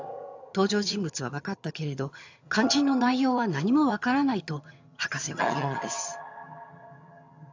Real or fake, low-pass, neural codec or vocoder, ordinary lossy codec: fake; 7.2 kHz; vocoder, 22.05 kHz, 80 mel bands, HiFi-GAN; AAC, 48 kbps